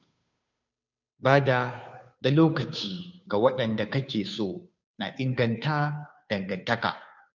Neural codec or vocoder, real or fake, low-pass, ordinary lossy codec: codec, 16 kHz, 2 kbps, FunCodec, trained on Chinese and English, 25 frames a second; fake; 7.2 kHz; none